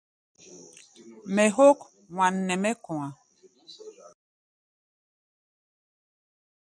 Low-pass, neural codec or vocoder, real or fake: 9.9 kHz; none; real